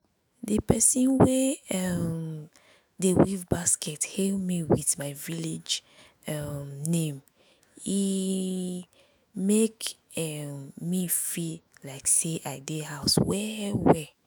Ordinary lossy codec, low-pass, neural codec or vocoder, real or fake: none; none; autoencoder, 48 kHz, 128 numbers a frame, DAC-VAE, trained on Japanese speech; fake